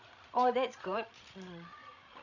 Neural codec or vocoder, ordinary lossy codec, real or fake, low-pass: codec, 16 kHz, 16 kbps, FreqCodec, larger model; none; fake; 7.2 kHz